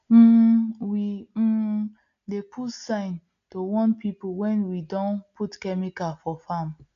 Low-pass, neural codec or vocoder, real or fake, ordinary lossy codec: 7.2 kHz; none; real; none